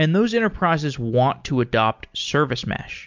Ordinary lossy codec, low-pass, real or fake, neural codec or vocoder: MP3, 64 kbps; 7.2 kHz; real; none